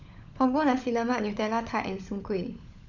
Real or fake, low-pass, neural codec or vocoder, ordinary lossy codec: fake; 7.2 kHz; codec, 16 kHz, 16 kbps, FunCodec, trained on LibriTTS, 50 frames a second; none